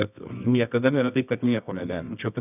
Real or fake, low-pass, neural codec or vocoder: fake; 3.6 kHz; codec, 24 kHz, 0.9 kbps, WavTokenizer, medium music audio release